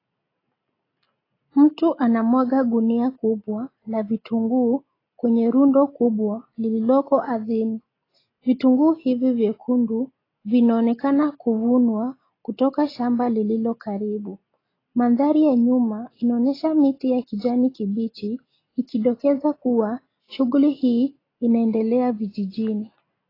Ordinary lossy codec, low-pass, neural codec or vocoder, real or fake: AAC, 24 kbps; 5.4 kHz; none; real